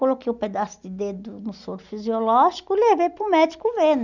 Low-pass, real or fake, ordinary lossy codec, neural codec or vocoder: 7.2 kHz; real; none; none